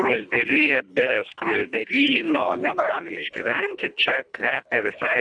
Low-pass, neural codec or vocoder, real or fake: 9.9 kHz; codec, 24 kHz, 1.5 kbps, HILCodec; fake